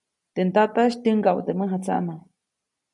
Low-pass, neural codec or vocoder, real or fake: 10.8 kHz; none; real